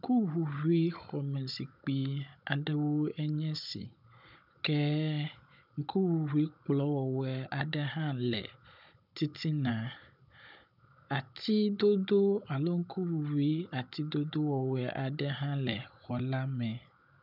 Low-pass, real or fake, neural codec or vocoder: 5.4 kHz; fake; codec, 16 kHz, 16 kbps, FunCodec, trained on Chinese and English, 50 frames a second